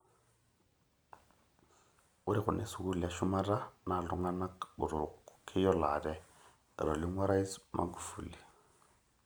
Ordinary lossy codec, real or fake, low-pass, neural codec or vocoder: none; real; none; none